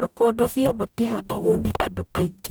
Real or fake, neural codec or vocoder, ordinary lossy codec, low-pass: fake; codec, 44.1 kHz, 0.9 kbps, DAC; none; none